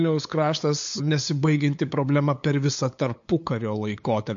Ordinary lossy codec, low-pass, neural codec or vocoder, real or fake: MP3, 48 kbps; 7.2 kHz; codec, 16 kHz, 8 kbps, FunCodec, trained on LibriTTS, 25 frames a second; fake